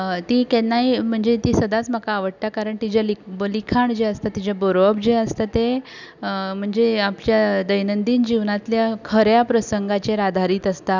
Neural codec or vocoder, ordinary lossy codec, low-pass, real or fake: none; none; 7.2 kHz; real